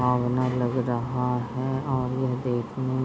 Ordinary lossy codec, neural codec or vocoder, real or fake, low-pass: none; none; real; none